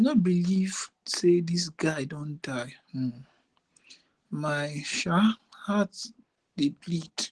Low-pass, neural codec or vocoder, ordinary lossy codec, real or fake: 10.8 kHz; none; Opus, 16 kbps; real